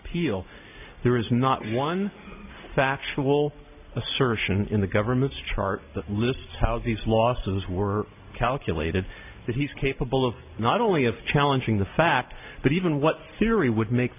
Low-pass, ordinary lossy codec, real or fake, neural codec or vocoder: 3.6 kHz; AAC, 32 kbps; real; none